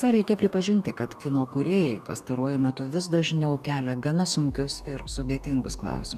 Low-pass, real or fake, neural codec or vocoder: 14.4 kHz; fake; codec, 44.1 kHz, 2.6 kbps, DAC